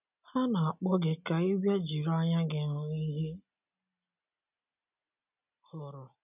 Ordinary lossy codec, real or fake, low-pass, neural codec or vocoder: none; real; 3.6 kHz; none